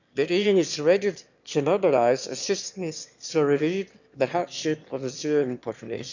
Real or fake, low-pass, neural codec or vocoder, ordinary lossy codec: fake; 7.2 kHz; autoencoder, 22.05 kHz, a latent of 192 numbers a frame, VITS, trained on one speaker; none